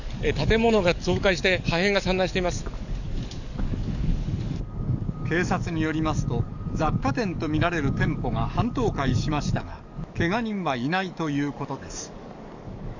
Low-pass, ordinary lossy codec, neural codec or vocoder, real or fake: 7.2 kHz; none; codec, 44.1 kHz, 7.8 kbps, DAC; fake